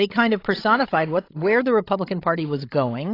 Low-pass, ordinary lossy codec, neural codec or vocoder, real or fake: 5.4 kHz; AAC, 32 kbps; codec, 16 kHz, 16 kbps, FreqCodec, larger model; fake